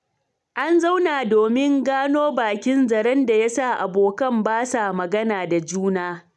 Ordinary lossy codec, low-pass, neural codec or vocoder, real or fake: none; none; none; real